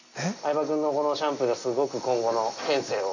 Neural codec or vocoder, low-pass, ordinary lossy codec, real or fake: none; 7.2 kHz; none; real